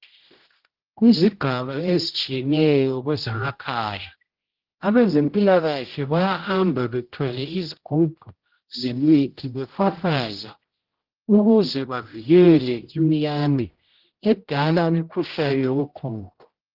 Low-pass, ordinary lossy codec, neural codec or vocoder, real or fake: 5.4 kHz; Opus, 16 kbps; codec, 16 kHz, 0.5 kbps, X-Codec, HuBERT features, trained on general audio; fake